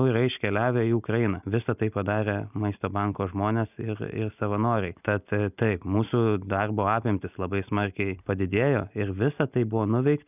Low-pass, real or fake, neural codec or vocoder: 3.6 kHz; real; none